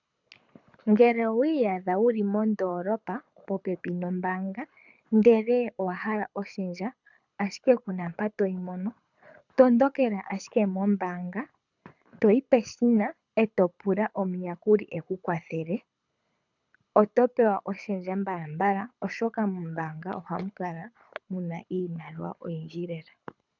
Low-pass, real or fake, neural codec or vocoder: 7.2 kHz; fake; codec, 24 kHz, 6 kbps, HILCodec